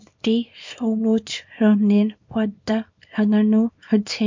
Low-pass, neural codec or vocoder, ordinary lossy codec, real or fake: 7.2 kHz; codec, 24 kHz, 0.9 kbps, WavTokenizer, small release; MP3, 48 kbps; fake